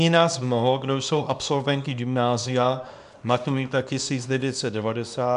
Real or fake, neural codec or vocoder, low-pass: fake; codec, 24 kHz, 0.9 kbps, WavTokenizer, small release; 10.8 kHz